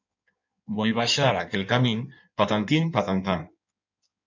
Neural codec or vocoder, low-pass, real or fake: codec, 16 kHz in and 24 kHz out, 1.1 kbps, FireRedTTS-2 codec; 7.2 kHz; fake